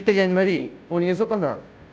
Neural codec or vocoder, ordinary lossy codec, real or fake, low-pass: codec, 16 kHz, 0.5 kbps, FunCodec, trained on Chinese and English, 25 frames a second; none; fake; none